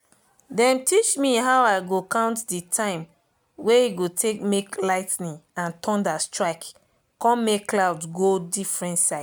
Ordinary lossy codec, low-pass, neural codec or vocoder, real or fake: none; none; none; real